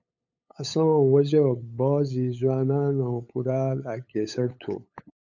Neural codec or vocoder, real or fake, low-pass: codec, 16 kHz, 8 kbps, FunCodec, trained on LibriTTS, 25 frames a second; fake; 7.2 kHz